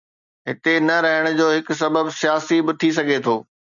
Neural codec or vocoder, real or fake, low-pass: none; real; 7.2 kHz